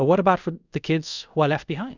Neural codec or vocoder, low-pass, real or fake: codec, 24 kHz, 0.5 kbps, DualCodec; 7.2 kHz; fake